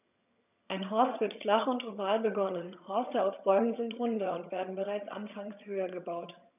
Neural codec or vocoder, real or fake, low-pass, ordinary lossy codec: vocoder, 22.05 kHz, 80 mel bands, HiFi-GAN; fake; 3.6 kHz; none